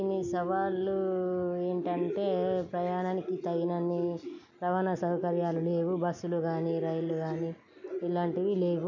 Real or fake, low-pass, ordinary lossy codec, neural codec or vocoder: real; 7.2 kHz; none; none